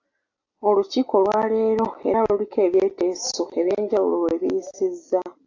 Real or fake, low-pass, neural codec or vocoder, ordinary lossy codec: real; 7.2 kHz; none; AAC, 48 kbps